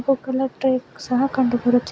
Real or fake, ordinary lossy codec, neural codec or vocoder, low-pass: real; none; none; none